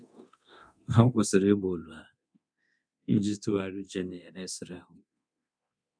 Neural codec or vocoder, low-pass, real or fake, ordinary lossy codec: codec, 24 kHz, 0.9 kbps, DualCodec; 9.9 kHz; fake; none